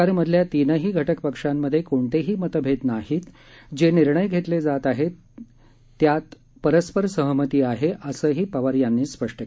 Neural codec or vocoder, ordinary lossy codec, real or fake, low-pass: none; none; real; none